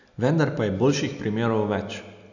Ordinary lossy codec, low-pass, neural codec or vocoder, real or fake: none; 7.2 kHz; none; real